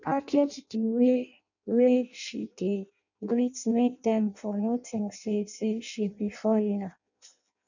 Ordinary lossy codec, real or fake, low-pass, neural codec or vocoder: none; fake; 7.2 kHz; codec, 16 kHz in and 24 kHz out, 0.6 kbps, FireRedTTS-2 codec